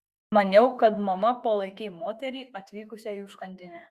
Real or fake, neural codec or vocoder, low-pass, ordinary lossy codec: fake; autoencoder, 48 kHz, 32 numbers a frame, DAC-VAE, trained on Japanese speech; 14.4 kHz; Opus, 64 kbps